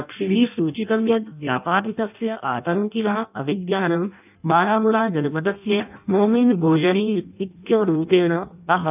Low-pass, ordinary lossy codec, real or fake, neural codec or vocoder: 3.6 kHz; none; fake; codec, 16 kHz in and 24 kHz out, 0.6 kbps, FireRedTTS-2 codec